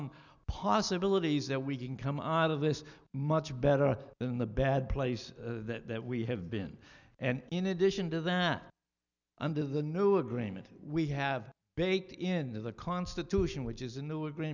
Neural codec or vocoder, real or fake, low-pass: none; real; 7.2 kHz